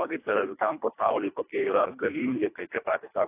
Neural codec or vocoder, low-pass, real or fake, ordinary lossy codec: codec, 24 kHz, 1.5 kbps, HILCodec; 3.6 kHz; fake; AAC, 24 kbps